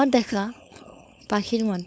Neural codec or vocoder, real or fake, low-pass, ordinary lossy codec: codec, 16 kHz, 4.8 kbps, FACodec; fake; none; none